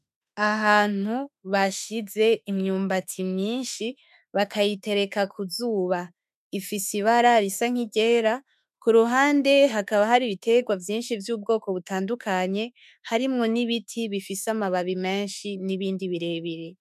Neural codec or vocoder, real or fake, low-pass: autoencoder, 48 kHz, 32 numbers a frame, DAC-VAE, trained on Japanese speech; fake; 14.4 kHz